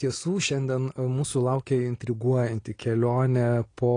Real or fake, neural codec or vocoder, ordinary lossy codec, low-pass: real; none; AAC, 32 kbps; 9.9 kHz